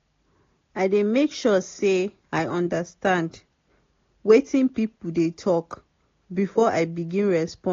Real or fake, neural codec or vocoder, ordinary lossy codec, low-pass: real; none; AAC, 32 kbps; 7.2 kHz